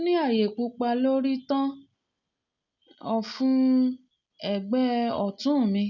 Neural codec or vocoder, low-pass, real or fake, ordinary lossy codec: none; 7.2 kHz; real; none